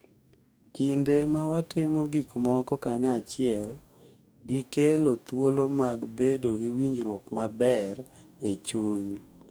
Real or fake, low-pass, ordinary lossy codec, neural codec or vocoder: fake; none; none; codec, 44.1 kHz, 2.6 kbps, DAC